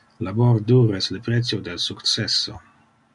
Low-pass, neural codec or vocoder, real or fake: 10.8 kHz; none; real